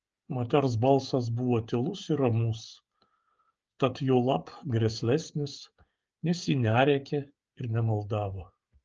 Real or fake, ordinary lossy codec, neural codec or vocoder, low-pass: fake; Opus, 24 kbps; codec, 16 kHz, 8 kbps, FreqCodec, smaller model; 7.2 kHz